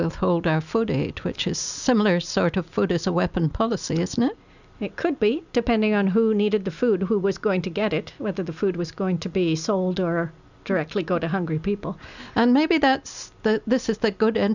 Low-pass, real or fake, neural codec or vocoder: 7.2 kHz; real; none